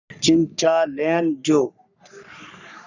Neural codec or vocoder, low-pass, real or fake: codec, 44.1 kHz, 3.4 kbps, Pupu-Codec; 7.2 kHz; fake